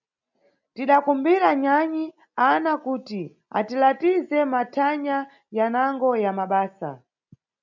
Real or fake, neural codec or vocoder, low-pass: real; none; 7.2 kHz